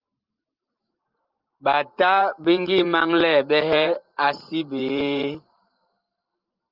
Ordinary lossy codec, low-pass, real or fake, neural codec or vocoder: Opus, 24 kbps; 5.4 kHz; fake; vocoder, 44.1 kHz, 128 mel bands every 512 samples, BigVGAN v2